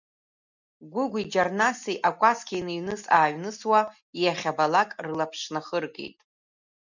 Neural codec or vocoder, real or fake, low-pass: none; real; 7.2 kHz